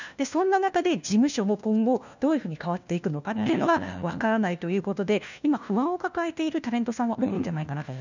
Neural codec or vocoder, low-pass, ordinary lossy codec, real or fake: codec, 16 kHz, 1 kbps, FunCodec, trained on LibriTTS, 50 frames a second; 7.2 kHz; none; fake